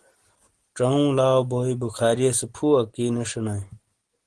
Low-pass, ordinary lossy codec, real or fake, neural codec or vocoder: 9.9 kHz; Opus, 16 kbps; real; none